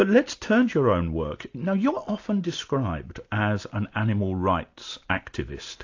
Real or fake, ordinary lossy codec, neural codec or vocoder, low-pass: real; AAC, 48 kbps; none; 7.2 kHz